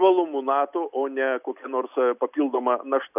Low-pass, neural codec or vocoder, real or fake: 3.6 kHz; none; real